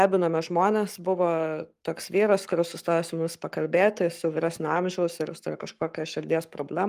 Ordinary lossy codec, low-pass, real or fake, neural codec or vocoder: Opus, 32 kbps; 14.4 kHz; fake; codec, 44.1 kHz, 7.8 kbps, Pupu-Codec